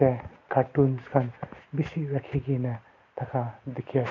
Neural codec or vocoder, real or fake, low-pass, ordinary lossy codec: none; real; 7.2 kHz; none